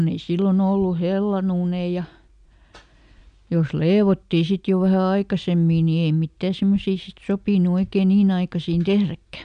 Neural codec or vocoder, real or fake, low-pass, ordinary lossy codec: none; real; 9.9 kHz; none